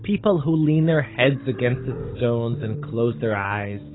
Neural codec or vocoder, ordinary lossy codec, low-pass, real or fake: codec, 16 kHz, 16 kbps, FunCodec, trained on Chinese and English, 50 frames a second; AAC, 16 kbps; 7.2 kHz; fake